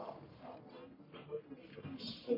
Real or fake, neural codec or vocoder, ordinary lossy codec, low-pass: fake; codec, 44.1 kHz, 1.7 kbps, Pupu-Codec; MP3, 32 kbps; 5.4 kHz